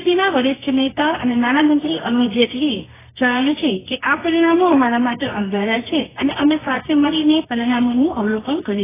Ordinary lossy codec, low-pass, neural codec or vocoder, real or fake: AAC, 16 kbps; 3.6 kHz; codec, 24 kHz, 0.9 kbps, WavTokenizer, medium music audio release; fake